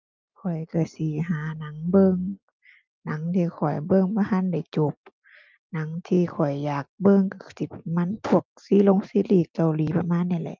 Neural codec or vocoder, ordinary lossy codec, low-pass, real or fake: none; Opus, 24 kbps; 7.2 kHz; real